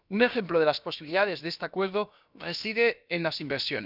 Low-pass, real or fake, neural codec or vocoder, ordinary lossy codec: 5.4 kHz; fake; codec, 16 kHz, about 1 kbps, DyCAST, with the encoder's durations; none